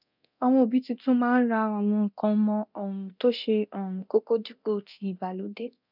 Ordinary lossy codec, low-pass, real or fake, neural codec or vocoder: none; 5.4 kHz; fake; codec, 24 kHz, 0.9 kbps, DualCodec